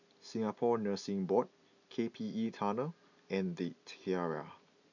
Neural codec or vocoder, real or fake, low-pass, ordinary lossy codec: none; real; 7.2 kHz; none